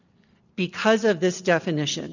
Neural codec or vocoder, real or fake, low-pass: none; real; 7.2 kHz